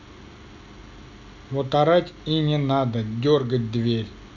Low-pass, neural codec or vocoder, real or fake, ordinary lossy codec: 7.2 kHz; none; real; Opus, 64 kbps